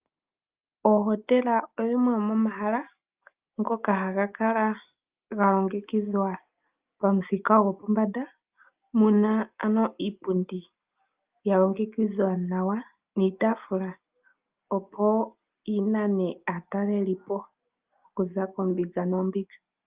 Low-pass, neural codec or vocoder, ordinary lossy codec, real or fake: 3.6 kHz; none; Opus, 32 kbps; real